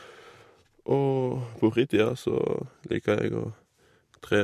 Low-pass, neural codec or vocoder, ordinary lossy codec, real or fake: 14.4 kHz; none; MP3, 64 kbps; real